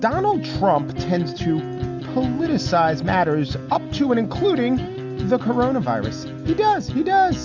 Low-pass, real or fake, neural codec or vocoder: 7.2 kHz; real; none